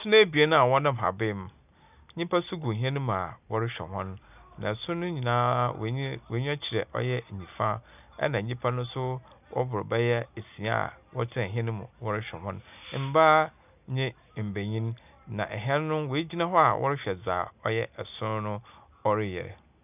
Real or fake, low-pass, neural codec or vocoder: real; 3.6 kHz; none